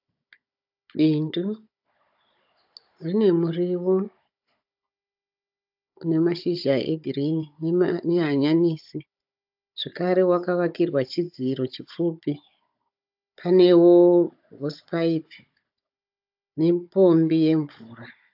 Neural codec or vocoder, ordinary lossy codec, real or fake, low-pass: codec, 16 kHz, 16 kbps, FunCodec, trained on Chinese and English, 50 frames a second; AAC, 48 kbps; fake; 5.4 kHz